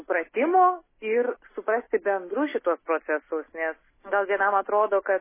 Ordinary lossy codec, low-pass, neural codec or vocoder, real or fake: MP3, 16 kbps; 3.6 kHz; none; real